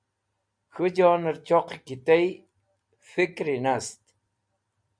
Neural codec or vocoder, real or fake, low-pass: none; real; 9.9 kHz